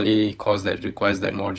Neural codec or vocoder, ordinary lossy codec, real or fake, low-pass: codec, 16 kHz, 16 kbps, FunCodec, trained on LibriTTS, 50 frames a second; none; fake; none